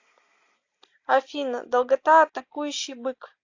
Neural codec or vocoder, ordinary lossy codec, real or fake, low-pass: none; AAC, 48 kbps; real; 7.2 kHz